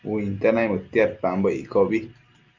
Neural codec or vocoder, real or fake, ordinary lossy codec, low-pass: none; real; Opus, 24 kbps; 7.2 kHz